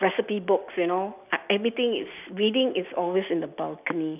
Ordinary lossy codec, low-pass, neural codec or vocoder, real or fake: none; 3.6 kHz; none; real